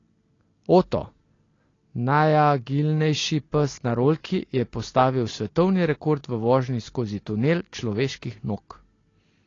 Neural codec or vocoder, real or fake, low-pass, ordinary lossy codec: none; real; 7.2 kHz; AAC, 32 kbps